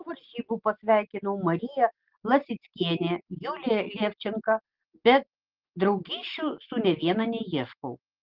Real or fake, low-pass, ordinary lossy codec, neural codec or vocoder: real; 5.4 kHz; Opus, 16 kbps; none